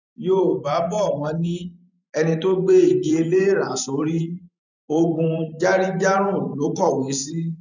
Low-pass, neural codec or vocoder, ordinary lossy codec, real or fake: 7.2 kHz; vocoder, 44.1 kHz, 128 mel bands every 512 samples, BigVGAN v2; none; fake